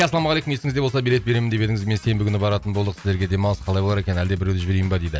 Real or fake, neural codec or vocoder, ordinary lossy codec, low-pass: real; none; none; none